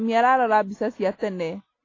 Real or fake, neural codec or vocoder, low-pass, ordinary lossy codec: real; none; 7.2 kHz; AAC, 32 kbps